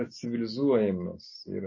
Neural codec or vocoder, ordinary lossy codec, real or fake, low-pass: none; MP3, 32 kbps; real; 7.2 kHz